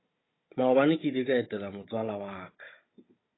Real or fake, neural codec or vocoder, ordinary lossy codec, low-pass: fake; codec, 16 kHz, 16 kbps, FunCodec, trained on Chinese and English, 50 frames a second; AAC, 16 kbps; 7.2 kHz